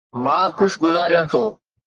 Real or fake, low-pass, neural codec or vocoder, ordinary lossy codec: fake; 10.8 kHz; codec, 44.1 kHz, 1.7 kbps, Pupu-Codec; Opus, 24 kbps